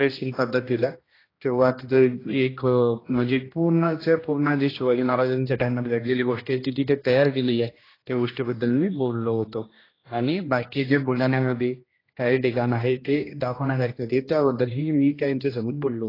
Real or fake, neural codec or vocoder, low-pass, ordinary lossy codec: fake; codec, 16 kHz, 1 kbps, X-Codec, HuBERT features, trained on general audio; 5.4 kHz; AAC, 24 kbps